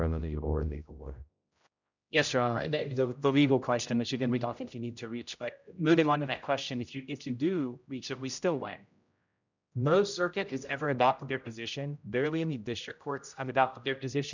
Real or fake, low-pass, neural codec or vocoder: fake; 7.2 kHz; codec, 16 kHz, 0.5 kbps, X-Codec, HuBERT features, trained on general audio